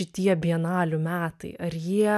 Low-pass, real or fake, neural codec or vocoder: 14.4 kHz; real; none